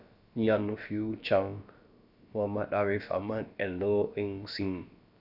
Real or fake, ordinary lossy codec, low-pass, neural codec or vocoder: fake; none; 5.4 kHz; codec, 16 kHz, about 1 kbps, DyCAST, with the encoder's durations